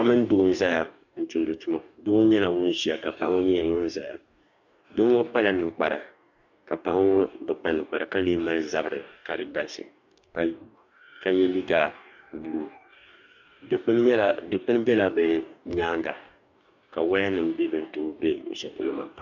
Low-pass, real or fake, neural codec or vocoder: 7.2 kHz; fake; codec, 44.1 kHz, 2.6 kbps, DAC